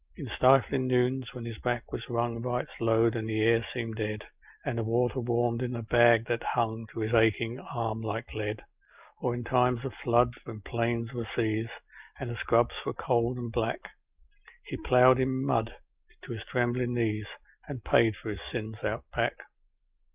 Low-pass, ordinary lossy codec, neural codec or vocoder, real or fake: 3.6 kHz; Opus, 24 kbps; none; real